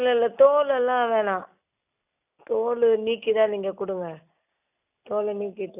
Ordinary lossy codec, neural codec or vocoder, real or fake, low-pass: none; none; real; 3.6 kHz